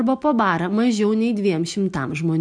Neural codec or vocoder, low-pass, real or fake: none; 9.9 kHz; real